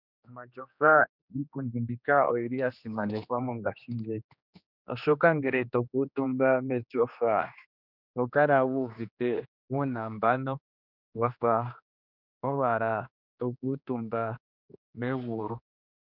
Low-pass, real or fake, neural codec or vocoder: 5.4 kHz; fake; codec, 16 kHz, 2 kbps, X-Codec, HuBERT features, trained on general audio